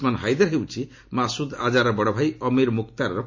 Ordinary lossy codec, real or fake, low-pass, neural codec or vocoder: MP3, 48 kbps; real; 7.2 kHz; none